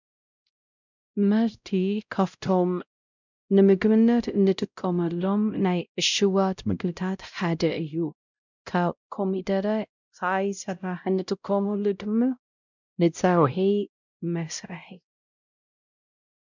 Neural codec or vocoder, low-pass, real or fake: codec, 16 kHz, 0.5 kbps, X-Codec, WavLM features, trained on Multilingual LibriSpeech; 7.2 kHz; fake